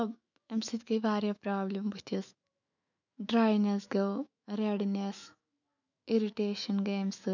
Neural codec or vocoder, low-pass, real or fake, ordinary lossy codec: autoencoder, 48 kHz, 128 numbers a frame, DAC-VAE, trained on Japanese speech; 7.2 kHz; fake; none